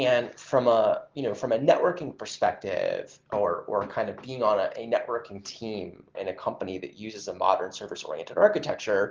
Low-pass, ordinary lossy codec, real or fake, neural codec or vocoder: 7.2 kHz; Opus, 16 kbps; real; none